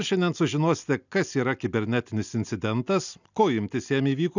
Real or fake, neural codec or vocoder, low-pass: real; none; 7.2 kHz